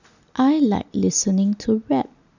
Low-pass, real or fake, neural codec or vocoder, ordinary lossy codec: 7.2 kHz; real; none; none